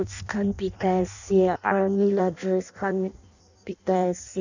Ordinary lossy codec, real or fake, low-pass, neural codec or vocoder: none; fake; 7.2 kHz; codec, 16 kHz in and 24 kHz out, 0.6 kbps, FireRedTTS-2 codec